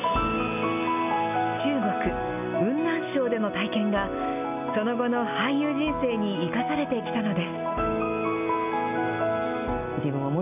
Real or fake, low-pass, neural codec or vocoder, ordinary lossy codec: real; 3.6 kHz; none; none